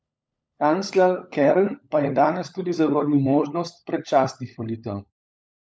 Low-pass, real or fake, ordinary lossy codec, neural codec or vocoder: none; fake; none; codec, 16 kHz, 16 kbps, FunCodec, trained on LibriTTS, 50 frames a second